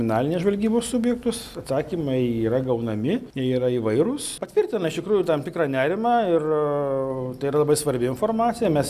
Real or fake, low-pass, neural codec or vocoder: real; 14.4 kHz; none